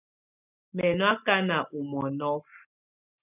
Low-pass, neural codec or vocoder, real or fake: 3.6 kHz; none; real